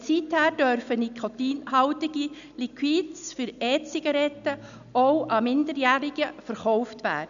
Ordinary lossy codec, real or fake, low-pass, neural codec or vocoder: none; real; 7.2 kHz; none